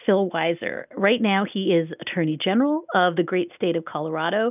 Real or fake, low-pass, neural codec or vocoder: real; 3.6 kHz; none